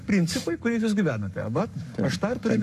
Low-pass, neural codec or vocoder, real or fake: 14.4 kHz; codec, 44.1 kHz, 7.8 kbps, Pupu-Codec; fake